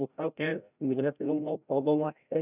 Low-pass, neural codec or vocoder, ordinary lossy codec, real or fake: 3.6 kHz; codec, 16 kHz, 0.5 kbps, FreqCodec, larger model; none; fake